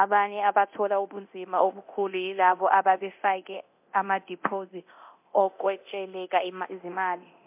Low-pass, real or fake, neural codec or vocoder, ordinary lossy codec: 3.6 kHz; fake; codec, 24 kHz, 0.9 kbps, DualCodec; MP3, 32 kbps